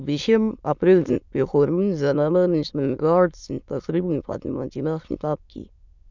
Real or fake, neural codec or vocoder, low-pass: fake; autoencoder, 22.05 kHz, a latent of 192 numbers a frame, VITS, trained on many speakers; 7.2 kHz